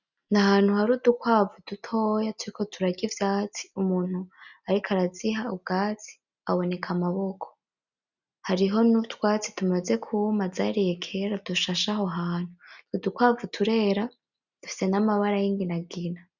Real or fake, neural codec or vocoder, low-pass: real; none; 7.2 kHz